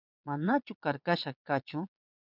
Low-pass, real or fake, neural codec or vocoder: 5.4 kHz; fake; vocoder, 22.05 kHz, 80 mel bands, Vocos